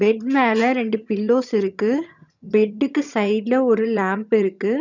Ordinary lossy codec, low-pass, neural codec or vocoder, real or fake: none; 7.2 kHz; vocoder, 22.05 kHz, 80 mel bands, HiFi-GAN; fake